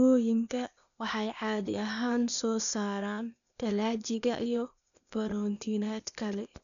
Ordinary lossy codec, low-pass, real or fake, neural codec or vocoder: none; 7.2 kHz; fake; codec, 16 kHz, 0.8 kbps, ZipCodec